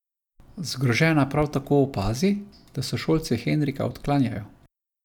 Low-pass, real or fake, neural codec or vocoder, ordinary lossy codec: 19.8 kHz; real; none; none